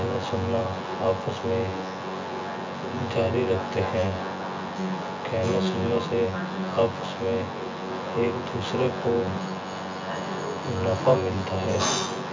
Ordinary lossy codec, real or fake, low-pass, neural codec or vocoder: MP3, 64 kbps; fake; 7.2 kHz; vocoder, 24 kHz, 100 mel bands, Vocos